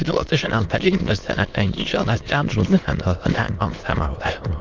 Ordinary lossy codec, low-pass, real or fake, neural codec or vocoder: Opus, 24 kbps; 7.2 kHz; fake; autoencoder, 22.05 kHz, a latent of 192 numbers a frame, VITS, trained on many speakers